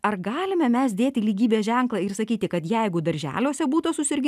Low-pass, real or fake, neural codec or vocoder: 14.4 kHz; real; none